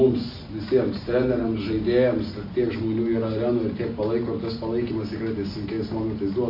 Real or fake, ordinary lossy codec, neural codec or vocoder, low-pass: real; MP3, 32 kbps; none; 5.4 kHz